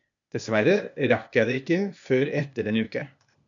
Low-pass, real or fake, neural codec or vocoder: 7.2 kHz; fake; codec, 16 kHz, 0.8 kbps, ZipCodec